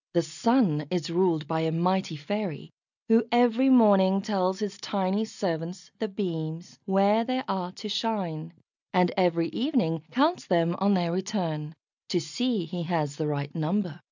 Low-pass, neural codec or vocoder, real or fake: 7.2 kHz; none; real